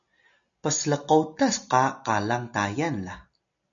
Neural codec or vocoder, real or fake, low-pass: none; real; 7.2 kHz